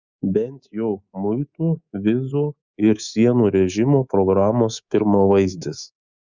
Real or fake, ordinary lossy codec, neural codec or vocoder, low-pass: fake; Opus, 64 kbps; codec, 24 kHz, 3.1 kbps, DualCodec; 7.2 kHz